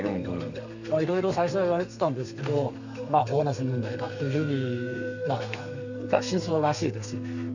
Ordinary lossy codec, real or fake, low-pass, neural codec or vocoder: none; fake; 7.2 kHz; codec, 32 kHz, 1.9 kbps, SNAC